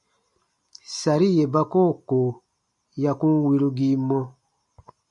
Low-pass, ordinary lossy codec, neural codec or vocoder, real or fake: 10.8 kHz; MP3, 64 kbps; none; real